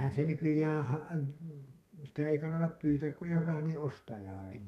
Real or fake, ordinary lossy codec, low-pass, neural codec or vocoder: fake; none; 14.4 kHz; codec, 32 kHz, 1.9 kbps, SNAC